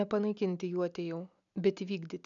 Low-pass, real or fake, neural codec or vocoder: 7.2 kHz; real; none